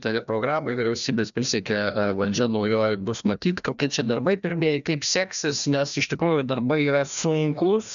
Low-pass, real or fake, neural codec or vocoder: 7.2 kHz; fake; codec, 16 kHz, 1 kbps, FreqCodec, larger model